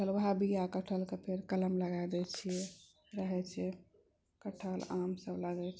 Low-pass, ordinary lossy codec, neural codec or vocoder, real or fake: none; none; none; real